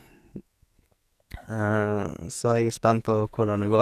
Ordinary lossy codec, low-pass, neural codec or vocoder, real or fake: none; 14.4 kHz; codec, 44.1 kHz, 2.6 kbps, SNAC; fake